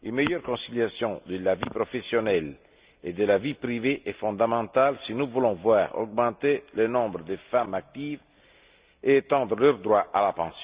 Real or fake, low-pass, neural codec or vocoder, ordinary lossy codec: real; 3.6 kHz; none; Opus, 64 kbps